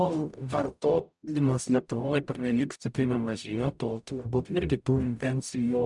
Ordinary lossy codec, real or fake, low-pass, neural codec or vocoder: MP3, 96 kbps; fake; 10.8 kHz; codec, 44.1 kHz, 0.9 kbps, DAC